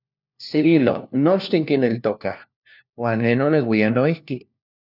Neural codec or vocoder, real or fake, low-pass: codec, 16 kHz, 1 kbps, FunCodec, trained on LibriTTS, 50 frames a second; fake; 5.4 kHz